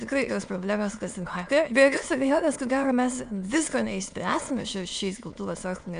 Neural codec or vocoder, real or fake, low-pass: autoencoder, 22.05 kHz, a latent of 192 numbers a frame, VITS, trained on many speakers; fake; 9.9 kHz